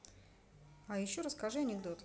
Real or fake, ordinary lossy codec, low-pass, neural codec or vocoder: real; none; none; none